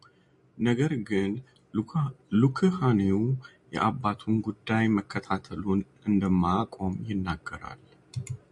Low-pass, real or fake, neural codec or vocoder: 10.8 kHz; real; none